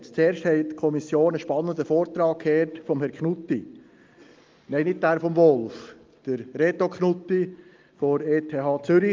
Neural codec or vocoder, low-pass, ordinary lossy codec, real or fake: none; 7.2 kHz; Opus, 32 kbps; real